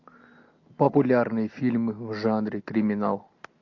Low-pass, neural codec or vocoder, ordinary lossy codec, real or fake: 7.2 kHz; none; MP3, 48 kbps; real